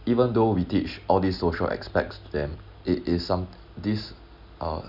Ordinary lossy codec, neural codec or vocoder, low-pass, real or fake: none; none; 5.4 kHz; real